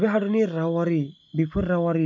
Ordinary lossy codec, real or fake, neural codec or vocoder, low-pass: MP3, 64 kbps; real; none; 7.2 kHz